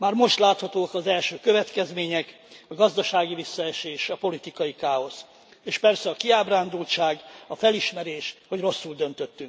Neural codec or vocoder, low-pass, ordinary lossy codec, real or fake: none; none; none; real